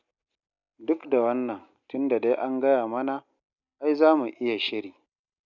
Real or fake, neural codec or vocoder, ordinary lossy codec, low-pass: real; none; none; 7.2 kHz